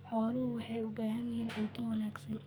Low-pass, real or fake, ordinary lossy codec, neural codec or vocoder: none; fake; none; codec, 44.1 kHz, 2.6 kbps, SNAC